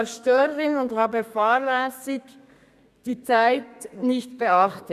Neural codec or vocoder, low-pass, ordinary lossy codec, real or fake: codec, 32 kHz, 1.9 kbps, SNAC; 14.4 kHz; none; fake